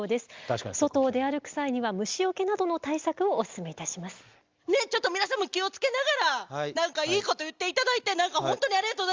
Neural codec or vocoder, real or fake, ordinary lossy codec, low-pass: none; real; Opus, 32 kbps; 7.2 kHz